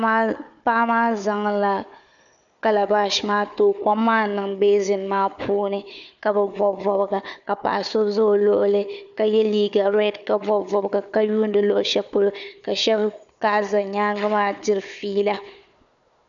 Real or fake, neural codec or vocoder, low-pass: fake; codec, 16 kHz, 4 kbps, FunCodec, trained on Chinese and English, 50 frames a second; 7.2 kHz